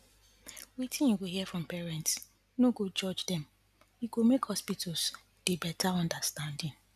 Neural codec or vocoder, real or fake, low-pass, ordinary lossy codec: none; real; 14.4 kHz; none